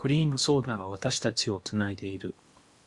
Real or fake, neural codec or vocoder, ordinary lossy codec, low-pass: fake; codec, 16 kHz in and 24 kHz out, 0.8 kbps, FocalCodec, streaming, 65536 codes; Opus, 64 kbps; 10.8 kHz